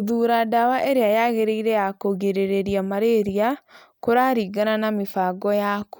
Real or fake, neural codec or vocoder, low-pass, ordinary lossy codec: real; none; none; none